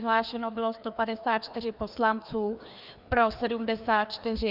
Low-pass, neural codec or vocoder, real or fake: 5.4 kHz; codec, 16 kHz, 2 kbps, FreqCodec, larger model; fake